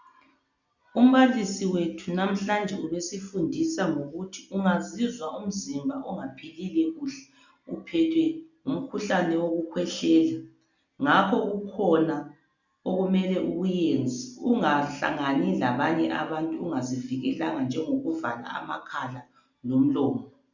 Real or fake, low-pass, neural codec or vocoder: real; 7.2 kHz; none